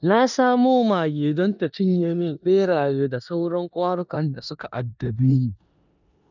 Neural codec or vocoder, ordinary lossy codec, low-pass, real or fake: codec, 16 kHz in and 24 kHz out, 0.9 kbps, LongCat-Audio-Codec, four codebook decoder; none; 7.2 kHz; fake